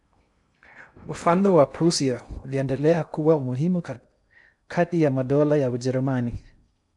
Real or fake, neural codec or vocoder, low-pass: fake; codec, 16 kHz in and 24 kHz out, 0.6 kbps, FocalCodec, streaming, 2048 codes; 10.8 kHz